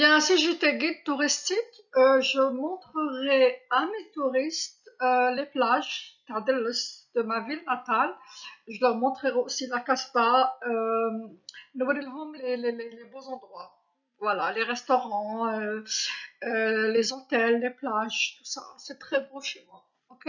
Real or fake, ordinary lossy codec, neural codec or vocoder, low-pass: real; none; none; 7.2 kHz